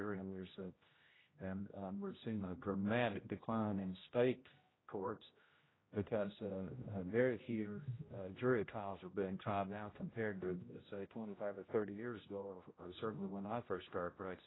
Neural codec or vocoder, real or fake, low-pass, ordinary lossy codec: codec, 16 kHz, 0.5 kbps, X-Codec, HuBERT features, trained on general audio; fake; 7.2 kHz; AAC, 16 kbps